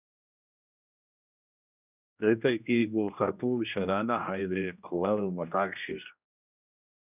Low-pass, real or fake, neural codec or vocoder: 3.6 kHz; fake; codec, 16 kHz, 1 kbps, X-Codec, HuBERT features, trained on general audio